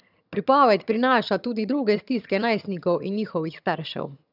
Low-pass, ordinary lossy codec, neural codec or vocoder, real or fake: 5.4 kHz; none; vocoder, 22.05 kHz, 80 mel bands, HiFi-GAN; fake